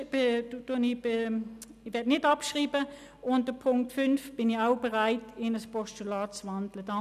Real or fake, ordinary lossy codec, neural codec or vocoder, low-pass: real; none; none; 14.4 kHz